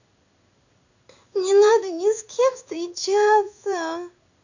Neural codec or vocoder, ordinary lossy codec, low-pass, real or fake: codec, 16 kHz in and 24 kHz out, 1 kbps, XY-Tokenizer; none; 7.2 kHz; fake